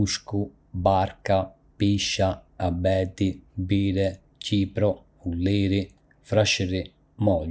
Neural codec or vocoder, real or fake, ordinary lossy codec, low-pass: none; real; none; none